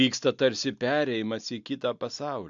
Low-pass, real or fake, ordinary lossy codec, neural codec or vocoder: 7.2 kHz; real; AAC, 64 kbps; none